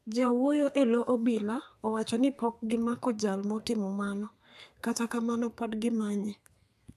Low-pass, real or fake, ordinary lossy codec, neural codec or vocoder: 14.4 kHz; fake; none; codec, 32 kHz, 1.9 kbps, SNAC